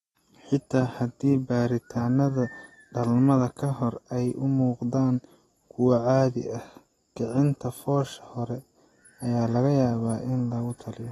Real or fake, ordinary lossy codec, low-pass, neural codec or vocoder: real; AAC, 32 kbps; 19.8 kHz; none